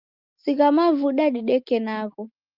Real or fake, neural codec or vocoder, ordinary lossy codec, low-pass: real; none; Opus, 32 kbps; 5.4 kHz